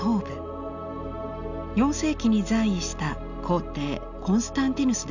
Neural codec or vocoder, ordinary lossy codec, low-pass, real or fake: none; none; 7.2 kHz; real